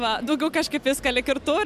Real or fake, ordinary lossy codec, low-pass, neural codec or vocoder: real; AAC, 96 kbps; 14.4 kHz; none